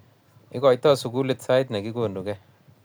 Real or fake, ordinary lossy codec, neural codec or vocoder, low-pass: real; none; none; none